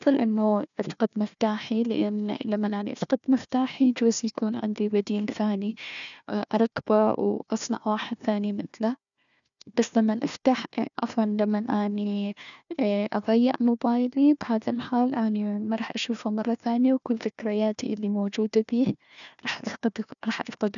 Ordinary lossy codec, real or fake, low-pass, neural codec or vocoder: none; fake; 7.2 kHz; codec, 16 kHz, 1 kbps, FunCodec, trained on Chinese and English, 50 frames a second